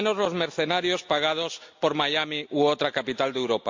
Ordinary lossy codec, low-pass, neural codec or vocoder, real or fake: MP3, 48 kbps; 7.2 kHz; none; real